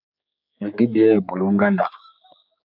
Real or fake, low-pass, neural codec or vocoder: fake; 5.4 kHz; codec, 16 kHz, 4 kbps, X-Codec, HuBERT features, trained on general audio